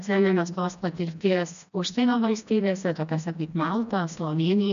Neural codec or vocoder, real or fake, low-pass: codec, 16 kHz, 1 kbps, FreqCodec, smaller model; fake; 7.2 kHz